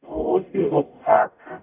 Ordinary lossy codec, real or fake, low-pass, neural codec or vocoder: none; fake; 3.6 kHz; codec, 44.1 kHz, 0.9 kbps, DAC